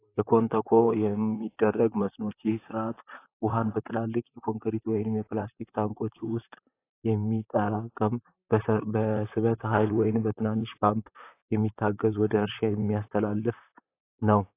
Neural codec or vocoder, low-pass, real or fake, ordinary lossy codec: vocoder, 44.1 kHz, 128 mel bands, Pupu-Vocoder; 3.6 kHz; fake; AAC, 24 kbps